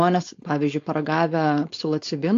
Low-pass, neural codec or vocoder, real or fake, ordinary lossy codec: 7.2 kHz; codec, 16 kHz, 4.8 kbps, FACodec; fake; AAC, 48 kbps